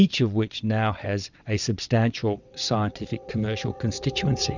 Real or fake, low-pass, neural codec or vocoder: fake; 7.2 kHz; vocoder, 22.05 kHz, 80 mel bands, WaveNeXt